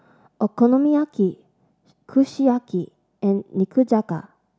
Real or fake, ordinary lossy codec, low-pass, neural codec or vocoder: real; none; none; none